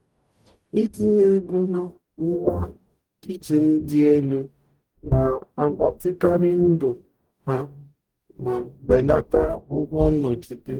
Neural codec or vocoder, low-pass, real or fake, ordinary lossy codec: codec, 44.1 kHz, 0.9 kbps, DAC; 19.8 kHz; fake; Opus, 24 kbps